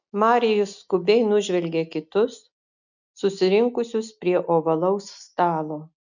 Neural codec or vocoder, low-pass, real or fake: none; 7.2 kHz; real